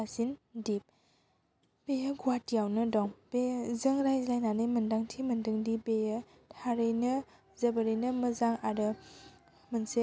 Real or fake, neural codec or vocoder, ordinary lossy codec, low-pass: real; none; none; none